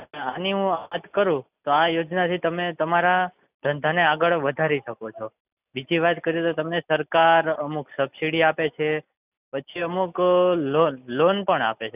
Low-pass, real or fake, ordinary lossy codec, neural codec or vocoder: 3.6 kHz; real; none; none